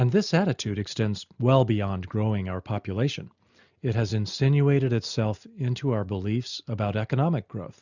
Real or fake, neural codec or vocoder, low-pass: real; none; 7.2 kHz